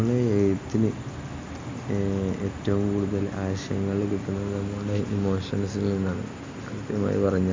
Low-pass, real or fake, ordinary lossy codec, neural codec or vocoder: 7.2 kHz; real; MP3, 64 kbps; none